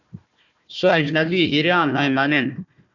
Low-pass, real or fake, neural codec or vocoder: 7.2 kHz; fake; codec, 16 kHz, 1 kbps, FunCodec, trained on Chinese and English, 50 frames a second